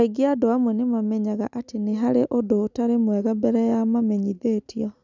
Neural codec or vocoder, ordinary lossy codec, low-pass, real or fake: none; none; 7.2 kHz; real